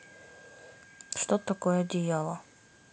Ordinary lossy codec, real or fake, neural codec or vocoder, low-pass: none; real; none; none